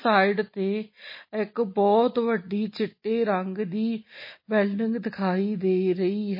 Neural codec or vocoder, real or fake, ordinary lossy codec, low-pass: none; real; MP3, 24 kbps; 5.4 kHz